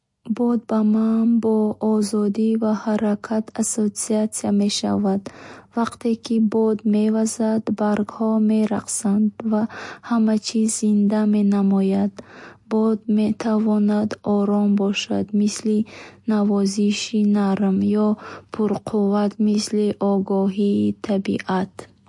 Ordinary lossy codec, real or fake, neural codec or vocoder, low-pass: MP3, 48 kbps; real; none; 10.8 kHz